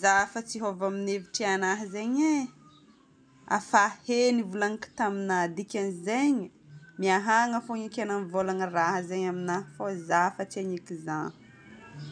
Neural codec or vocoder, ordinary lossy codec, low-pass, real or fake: none; MP3, 96 kbps; 9.9 kHz; real